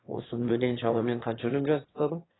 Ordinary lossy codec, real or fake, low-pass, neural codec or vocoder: AAC, 16 kbps; fake; 7.2 kHz; autoencoder, 22.05 kHz, a latent of 192 numbers a frame, VITS, trained on one speaker